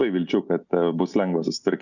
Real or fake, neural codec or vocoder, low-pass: real; none; 7.2 kHz